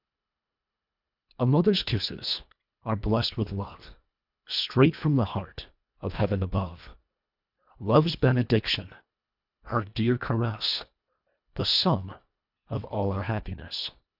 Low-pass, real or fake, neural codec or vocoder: 5.4 kHz; fake; codec, 24 kHz, 1.5 kbps, HILCodec